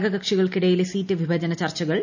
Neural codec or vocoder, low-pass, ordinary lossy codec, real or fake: none; 7.2 kHz; none; real